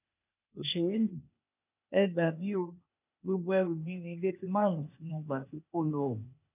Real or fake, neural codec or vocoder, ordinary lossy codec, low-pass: fake; codec, 16 kHz, 0.8 kbps, ZipCodec; none; 3.6 kHz